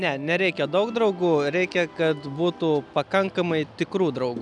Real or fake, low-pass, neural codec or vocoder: real; 10.8 kHz; none